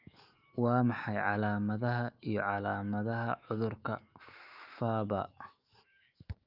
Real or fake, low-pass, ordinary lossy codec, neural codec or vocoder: fake; 5.4 kHz; Opus, 24 kbps; autoencoder, 48 kHz, 128 numbers a frame, DAC-VAE, trained on Japanese speech